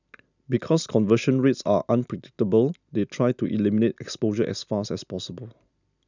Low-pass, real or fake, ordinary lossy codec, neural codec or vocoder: 7.2 kHz; real; none; none